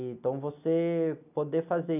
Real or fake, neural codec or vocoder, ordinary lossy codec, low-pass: real; none; none; 3.6 kHz